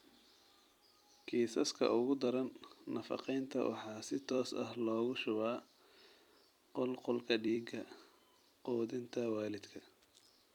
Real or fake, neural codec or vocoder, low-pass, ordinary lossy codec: fake; vocoder, 44.1 kHz, 128 mel bands every 256 samples, BigVGAN v2; 19.8 kHz; none